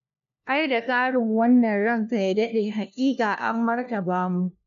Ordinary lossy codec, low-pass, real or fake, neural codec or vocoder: none; 7.2 kHz; fake; codec, 16 kHz, 1 kbps, FunCodec, trained on LibriTTS, 50 frames a second